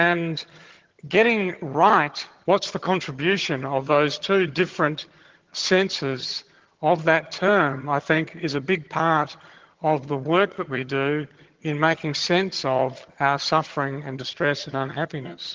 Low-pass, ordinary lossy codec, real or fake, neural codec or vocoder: 7.2 kHz; Opus, 16 kbps; fake; vocoder, 22.05 kHz, 80 mel bands, HiFi-GAN